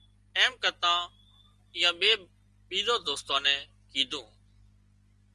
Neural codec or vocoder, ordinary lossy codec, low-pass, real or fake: none; Opus, 24 kbps; 10.8 kHz; real